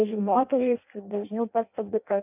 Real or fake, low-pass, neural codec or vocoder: fake; 3.6 kHz; codec, 16 kHz in and 24 kHz out, 0.6 kbps, FireRedTTS-2 codec